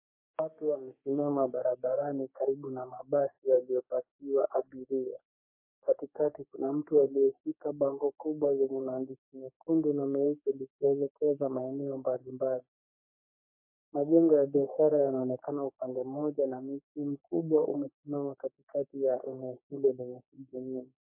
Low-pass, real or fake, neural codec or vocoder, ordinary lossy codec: 3.6 kHz; fake; codec, 44.1 kHz, 3.4 kbps, Pupu-Codec; MP3, 24 kbps